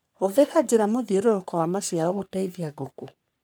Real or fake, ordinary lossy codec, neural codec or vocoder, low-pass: fake; none; codec, 44.1 kHz, 3.4 kbps, Pupu-Codec; none